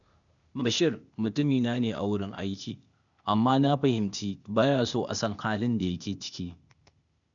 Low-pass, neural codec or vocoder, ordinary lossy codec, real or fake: 7.2 kHz; codec, 16 kHz, 0.8 kbps, ZipCodec; none; fake